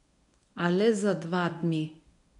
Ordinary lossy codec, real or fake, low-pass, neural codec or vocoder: none; fake; 10.8 kHz; codec, 24 kHz, 0.9 kbps, WavTokenizer, medium speech release version 1